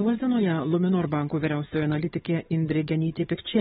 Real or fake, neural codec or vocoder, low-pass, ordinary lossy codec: fake; codec, 16 kHz, 16 kbps, FunCodec, trained on LibriTTS, 50 frames a second; 7.2 kHz; AAC, 16 kbps